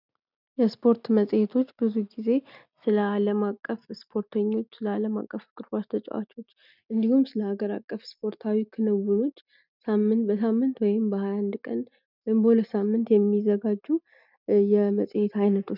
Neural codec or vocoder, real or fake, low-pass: none; real; 5.4 kHz